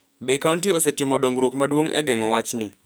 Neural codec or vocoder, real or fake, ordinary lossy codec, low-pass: codec, 44.1 kHz, 2.6 kbps, SNAC; fake; none; none